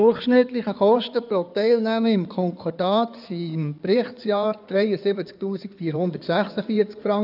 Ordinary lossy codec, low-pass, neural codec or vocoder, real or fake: none; 5.4 kHz; codec, 16 kHz in and 24 kHz out, 2.2 kbps, FireRedTTS-2 codec; fake